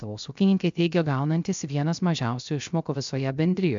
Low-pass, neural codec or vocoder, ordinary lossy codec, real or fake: 7.2 kHz; codec, 16 kHz, 0.3 kbps, FocalCodec; MP3, 64 kbps; fake